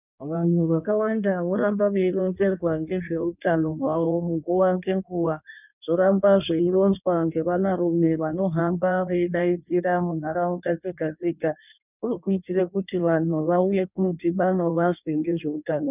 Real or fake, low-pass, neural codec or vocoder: fake; 3.6 kHz; codec, 16 kHz in and 24 kHz out, 1.1 kbps, FireRedTTS-2 codec